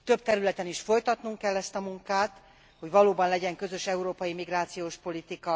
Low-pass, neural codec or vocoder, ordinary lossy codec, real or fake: none; none; none; real